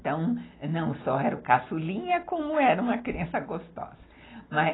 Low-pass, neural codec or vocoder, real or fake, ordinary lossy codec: 7.2 kHz; none; real; AAC, 16 kbps